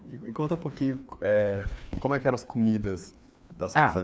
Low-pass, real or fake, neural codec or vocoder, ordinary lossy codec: none; fake; codec, 16 kHz, 2 kbps, FreqCodec, larger model; none